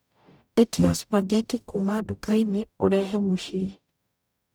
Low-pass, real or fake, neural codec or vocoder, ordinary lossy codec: none; fake; codec, 44.1 kHz, 0.9 kbps, DAC; none